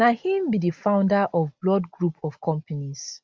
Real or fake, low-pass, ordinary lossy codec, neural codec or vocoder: real; none; none; none